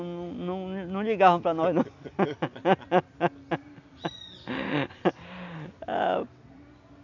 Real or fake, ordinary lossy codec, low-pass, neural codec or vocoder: real; none; 7.2 kHz; none